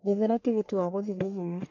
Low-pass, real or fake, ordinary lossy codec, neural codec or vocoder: 7.2 kHz; fake; MP3, 48 kbps; codec, 44.1 kHz, 1.7 kbps, Pupu-Codec